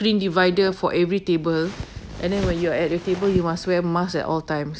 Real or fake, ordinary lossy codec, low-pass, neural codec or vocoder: real; none; none; none